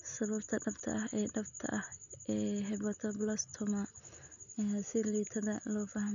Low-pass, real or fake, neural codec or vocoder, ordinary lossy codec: 7.2 kHz; real; none; MP3, 96 kbps